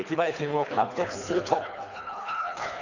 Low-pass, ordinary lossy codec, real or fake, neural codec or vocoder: 7.2 kHz; none; fake; codec, 24 kHz, 3 kbps, HILCodec